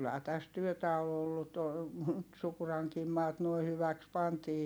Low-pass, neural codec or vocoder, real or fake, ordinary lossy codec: none; none; real; none